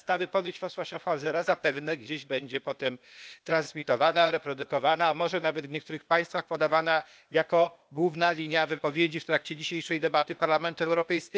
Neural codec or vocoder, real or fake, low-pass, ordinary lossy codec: codec, 16 kHz, 0.8 kbps, ZipCodec; fake; none; none